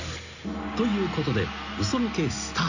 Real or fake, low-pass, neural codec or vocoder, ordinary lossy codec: real; 7.2 kHz; none; none